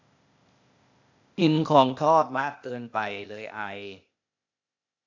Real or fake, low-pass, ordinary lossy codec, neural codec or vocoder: fake; 7.2 kHz; AAC, 48 kbps; codec, 16 kHz, 0.8 kbps, ZipCodec